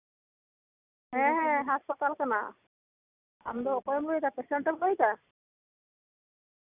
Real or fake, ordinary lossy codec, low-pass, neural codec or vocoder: real; none; 3.6 kHz; none